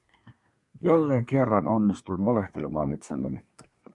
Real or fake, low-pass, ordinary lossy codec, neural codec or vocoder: fake; 10.8 kHz; MP3, 96 kbps; codec, 24 kHz, 1 kbps, SNAC